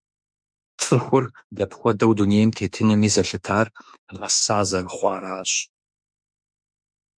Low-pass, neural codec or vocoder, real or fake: 9.9 kHz; autoencoder, 48 kHz, 32 numbers a frame, DAC-VAE, trained on Japanese speech; fake